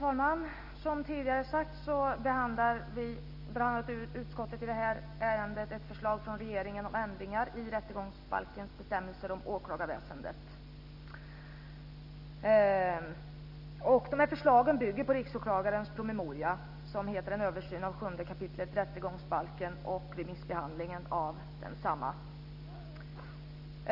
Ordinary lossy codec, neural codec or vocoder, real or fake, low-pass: none; none; real; 5.4 kHz